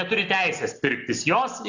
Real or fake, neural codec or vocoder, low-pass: real; none; 7.2 kHz